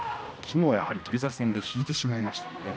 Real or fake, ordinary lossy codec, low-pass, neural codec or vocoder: fake; none; none; codec, 16 kHz, 1 kbps, X-Codec, HuBERT features, trained on balanced general audio